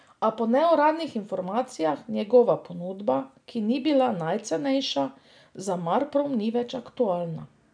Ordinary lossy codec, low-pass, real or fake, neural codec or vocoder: none; 9.9 kHz; real; none